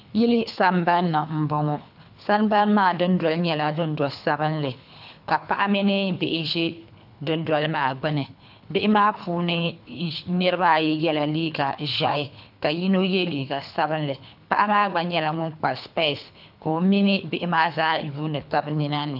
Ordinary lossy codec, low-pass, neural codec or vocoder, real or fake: AAC, 48 kbps; 5.4 kHz; codec, 24 kHz, 3 kbps, HILCodec; fake